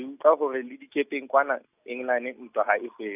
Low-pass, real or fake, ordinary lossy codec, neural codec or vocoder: 3.6 kHz; real; none; none